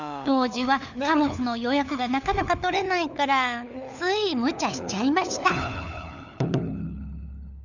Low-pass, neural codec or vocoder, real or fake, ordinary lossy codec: 7.2 kHz; codec, 16 kHz, 4 kbps, FunCodec, trained on LibriTTS, 50 frames a second; fake; none